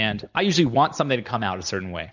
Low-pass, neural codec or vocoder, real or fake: 7.2 kHz; none; real